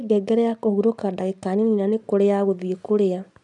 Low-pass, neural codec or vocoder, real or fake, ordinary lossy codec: 10.8 kHz; none; real; none